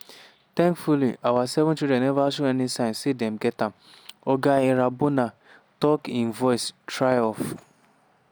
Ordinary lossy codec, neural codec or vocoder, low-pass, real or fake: none; none; none; real